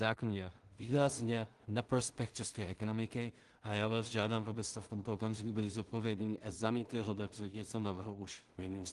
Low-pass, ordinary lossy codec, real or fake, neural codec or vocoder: 10.8 kHz; Opus, 24 kbps; fake; codec, 16 kHz in and 24 kHz out, 0.4 kbps, LongCat-Audio-Codec, two codebook decoder